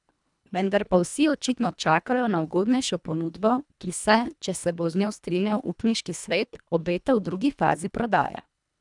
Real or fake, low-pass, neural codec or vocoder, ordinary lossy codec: fake; 10.8 kHz; codec, 24 kHz, 1.5 kbps, HILCodec; none